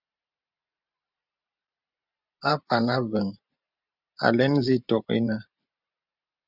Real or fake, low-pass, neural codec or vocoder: fake; 5.4 kHz; vocoder, 44.1 kHz, 128 mel bands every 512 samples, BigVGAN v2